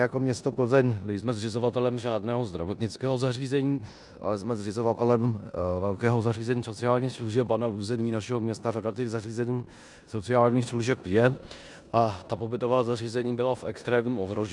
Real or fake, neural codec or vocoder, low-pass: fake; codec, 16 kHz in and 24 kHz out, 0.9 kbps, LongCat-Audio-Codec, four codebook decoder; 10.8 kHz